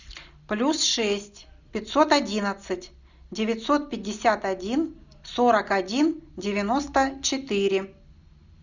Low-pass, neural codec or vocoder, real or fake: 7.2 kHz; none; real